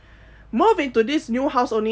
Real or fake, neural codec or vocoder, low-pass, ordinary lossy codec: real; none; none; none